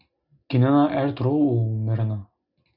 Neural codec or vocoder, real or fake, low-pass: none; real; 5.4 kHz